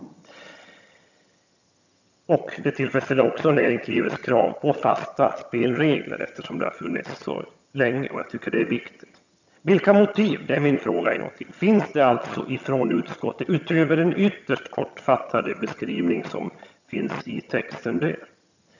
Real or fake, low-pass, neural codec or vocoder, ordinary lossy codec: fake; 7.2 kHz; vocoder, 22.05 kHz, 80 mel bands, HiFi-GAN; none